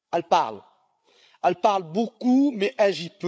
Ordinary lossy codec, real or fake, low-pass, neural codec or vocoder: none; fake; none; codec, 16 kHz, 8 kbps, FreqCodec, larger model